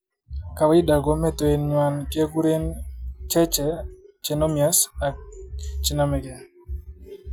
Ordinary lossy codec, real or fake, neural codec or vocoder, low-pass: none; real; none; none